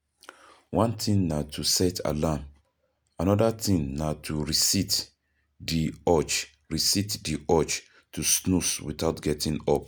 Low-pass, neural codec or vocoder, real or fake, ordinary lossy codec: none; none; real; none